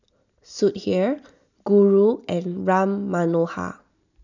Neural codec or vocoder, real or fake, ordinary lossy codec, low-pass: none; real; none; 7.2 kHz